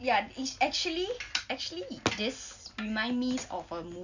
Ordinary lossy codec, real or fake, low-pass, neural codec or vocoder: none; real; 7.2 kHz; none